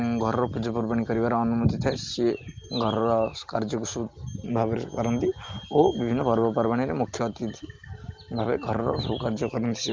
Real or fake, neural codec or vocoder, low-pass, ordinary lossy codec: real; none; 7.2 kHz; Opus, 32 kbps